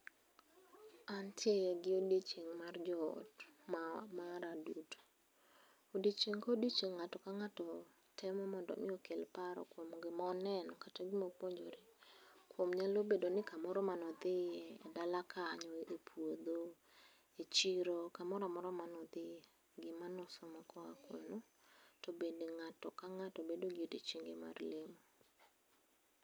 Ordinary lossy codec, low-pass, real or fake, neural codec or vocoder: none; none; real; none